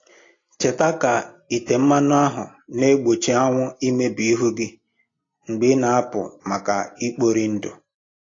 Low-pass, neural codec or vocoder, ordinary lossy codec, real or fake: 7.2 kHz; none; AAC, 32 kbps; real